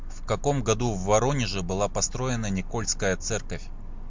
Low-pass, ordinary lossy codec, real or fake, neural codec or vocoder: 7.2 kHz; MP3, 64 kbps; real; none